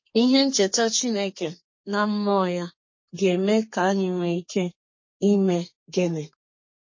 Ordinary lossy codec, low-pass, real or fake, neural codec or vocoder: MP3, 32 kbps; 7.2 kHz; fake; codec, 32 kHz, 1.9 kbps, SNAC